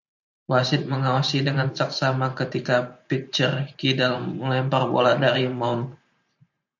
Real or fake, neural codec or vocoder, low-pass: fake; vocoder, 44.1 kHz, 128 mel bands every 512 samples, BigVGAN v2; 7.2 kHz